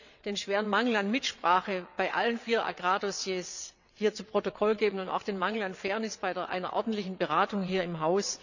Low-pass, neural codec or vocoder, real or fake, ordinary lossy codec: 7.2 kHz; vocoder, 22.05 kHz, 80 mel bands, WaveNeXt; fake; none